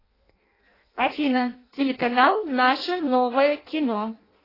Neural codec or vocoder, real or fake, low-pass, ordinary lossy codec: codec, 16 kHz in and 24 kHz out, 0.6 kbps, FireRedTTS-2 codec; fake; 5.4 kHz; AAC, 24 kbps